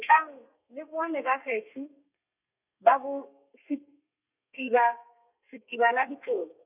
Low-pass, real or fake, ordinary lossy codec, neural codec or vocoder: 3.6 kHz; fake; none; codec, 32 kHz, 1.9 kbps, SNAC